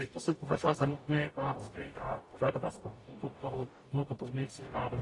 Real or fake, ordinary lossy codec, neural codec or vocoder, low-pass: fake; AAC, 32 kbps; codec, 44.1 kHz, 0.9 kbps, DAC; 10.8 kHz